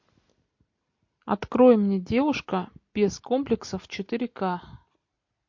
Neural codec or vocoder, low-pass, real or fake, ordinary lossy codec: none; 7.2 kHz; real; MP3, 48 kbps